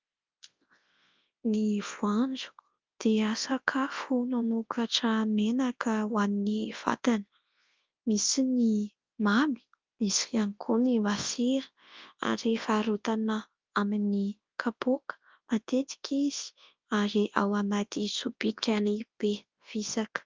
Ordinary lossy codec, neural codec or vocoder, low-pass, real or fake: Opus, 24 kbps; codec, 24 kHz, 0.9 kbps, WavTokenizer, large speech release; 7.2 kHz; fake